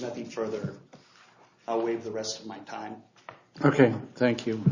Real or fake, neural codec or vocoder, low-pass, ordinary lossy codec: real; none; 7.2 kHz; Opus, 64 kbps